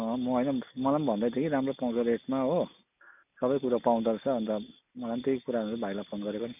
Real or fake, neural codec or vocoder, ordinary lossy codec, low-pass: real; none; none; 3.6 kHz